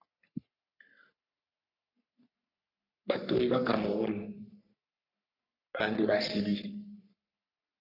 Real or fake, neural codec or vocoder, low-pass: fake; codec, 44.1 kHz, 3.4 kbps, Pupu-Codec; 5.4 kHz